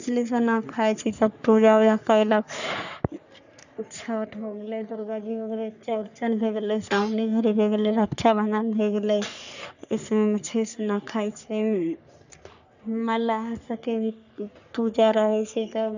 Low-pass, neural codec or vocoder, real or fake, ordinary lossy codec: 7.2 kHz; codec, 44.1 kHz, 3.4 kbps, Pupu-Codec; fake; none